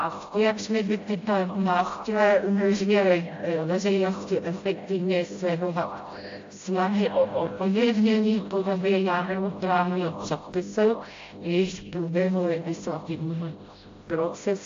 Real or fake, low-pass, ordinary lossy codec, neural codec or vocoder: fake; 7.2 kHz; AAC, 48 kbps; codec, 16 kHz, 0.5 kbps, FreqCodec, smaller model